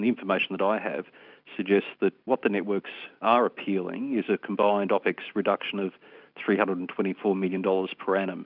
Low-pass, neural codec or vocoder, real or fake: 5.4 kHz; none; real